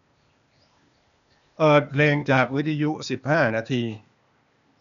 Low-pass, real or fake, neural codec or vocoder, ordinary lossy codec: 7.2 kHz; fake; codec, 16 kHz, 0.8 kbps, ZipCodec; none